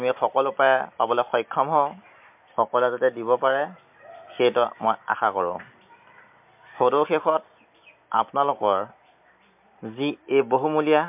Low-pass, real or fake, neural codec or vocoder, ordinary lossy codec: 3.6 kHz; real; none; MP3, 32 kbps